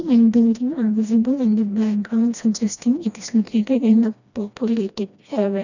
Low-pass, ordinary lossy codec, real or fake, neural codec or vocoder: 7.2 kHz; none; fake; codec, 16 kHz, 1 kbps, FreqCodec, smaller model